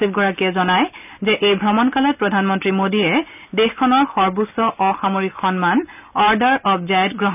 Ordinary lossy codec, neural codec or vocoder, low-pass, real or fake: none; none; 3.6 kHz; real